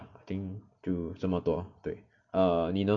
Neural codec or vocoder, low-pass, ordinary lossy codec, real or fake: none; 7.2 kHz; none; real